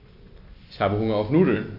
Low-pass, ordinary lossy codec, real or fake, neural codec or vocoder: 5.4 kHz; AAC, 48 kbps; real; none